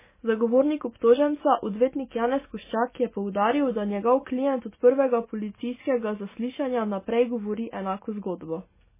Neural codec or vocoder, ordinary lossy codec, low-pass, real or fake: none; MP3, 16 kbps; 3.6 kHz; real